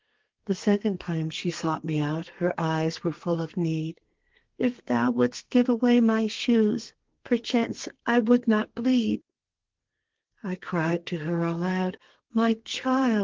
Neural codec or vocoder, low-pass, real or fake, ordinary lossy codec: codec, 32 kHz, 1.9 kbps, SNAC; 7.2 kHz; fake; Opus, 16 kbps